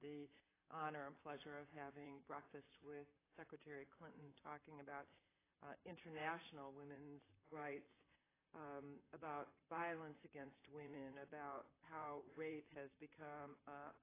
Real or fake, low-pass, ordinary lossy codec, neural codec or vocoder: fake; 3.6 kHz; AAC, 16 kbps; vocoder, 44.1 kHz, 128 mel bands, Pupu-Vocoder